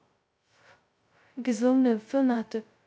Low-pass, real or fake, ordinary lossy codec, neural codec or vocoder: none; fake; none; codec, 16 kHz, 0.2 kbps, FocalCodec